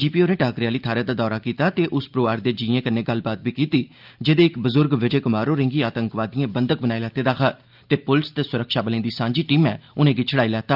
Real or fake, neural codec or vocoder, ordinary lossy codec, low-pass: real; none; Opus, 24 kbps; 5.4 kHz